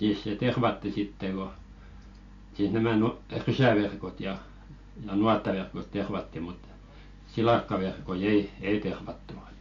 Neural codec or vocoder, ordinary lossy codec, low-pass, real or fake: none; AAC, 48 kbps; 7.2 kHz; real